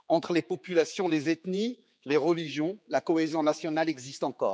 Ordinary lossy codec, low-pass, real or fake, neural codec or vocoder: none; none; fake; codec, 16 kHz, 4 kbps, X-Codec, HuBERT features, trained on general audio